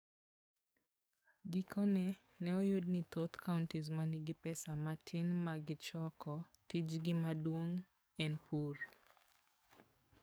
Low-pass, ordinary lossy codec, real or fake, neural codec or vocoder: none; none; fake; codec, 44.1 kHz, 7.8 kbps, DAC